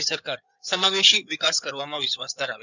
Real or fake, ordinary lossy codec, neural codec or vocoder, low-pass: fake; none; codec, 16 kHz in and 24 kHz out, 2.2 kbps, FireRedTTS-2 codec; 7.2 kHz